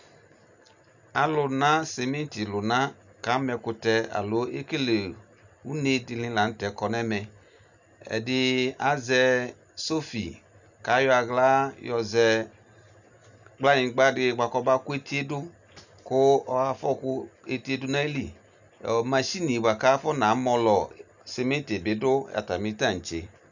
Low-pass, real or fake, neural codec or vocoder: 7.2 kHz; real; none